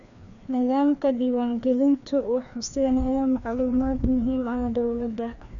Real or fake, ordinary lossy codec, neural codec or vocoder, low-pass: fake; none; codec, 16 kHz, 2 kbps, FreqCodec, larger model; 7.2 kHz